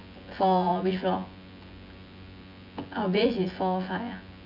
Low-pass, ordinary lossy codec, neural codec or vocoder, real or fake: 5.4 kHz; MP3, 48 kbps; vocoder, 24 kHz, 100 mel bands, Vocos; fake